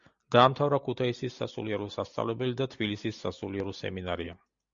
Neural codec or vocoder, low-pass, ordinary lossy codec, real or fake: none; 7.2 kHz; Opus, 64 kbps; real